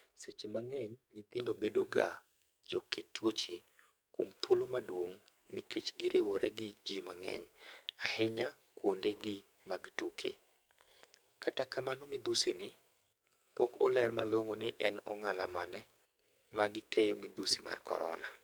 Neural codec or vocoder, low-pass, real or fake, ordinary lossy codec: codec, 44.1 kHz, 2.6 kbps, SNAC; none; fake; none